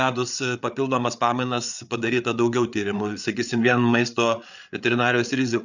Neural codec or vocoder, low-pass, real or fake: codec, 16 kHz, 8 kbps, FreqCodec, larger model; 7.2 kHz; fake